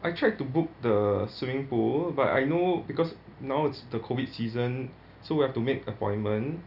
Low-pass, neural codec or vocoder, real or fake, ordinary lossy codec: 5.4 kHz; none; real; none